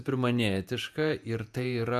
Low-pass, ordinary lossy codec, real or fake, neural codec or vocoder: 14.4 kHz; AAC, 96 kbps; fake; vocoder, 48 kHz, 128 mel bands, Vocos